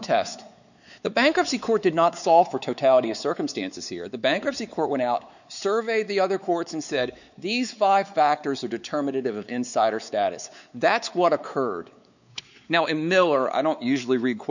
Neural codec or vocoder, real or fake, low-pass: codec, 16 kHz, 4 kbps, X-Codec, WavLM features, trained on Multilingual LibriSpeech; fake; 7.2 kHz